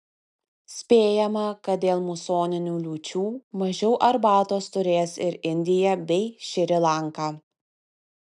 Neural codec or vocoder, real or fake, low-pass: none; real; 10.8 kHz